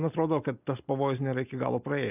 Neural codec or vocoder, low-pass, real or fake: none; 3.6 kHz; real